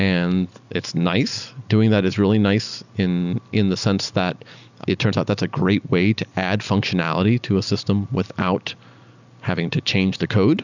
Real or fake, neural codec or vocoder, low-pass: real; none; 7.2 kHz